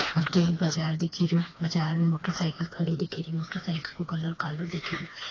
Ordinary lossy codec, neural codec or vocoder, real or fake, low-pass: AAC, 32 kbps; codec, 16 kHz, 2 kbps, FreqCodec, smaller model; fake; 7.2 kHz